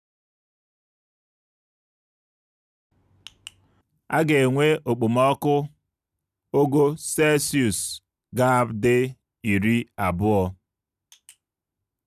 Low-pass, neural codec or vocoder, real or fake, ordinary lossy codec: 14.4 kHz; none; real; none